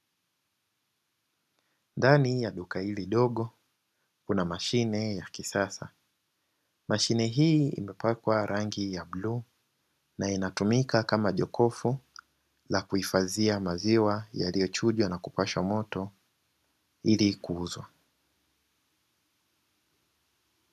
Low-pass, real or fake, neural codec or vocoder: 14.4 kHz; real; none